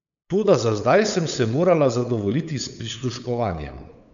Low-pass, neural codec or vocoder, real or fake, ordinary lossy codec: 7.2 kHz; codec, 16 kHz, 8 kbps, FunCodec, trained on LibriTTS, 25 frames a second; fake; none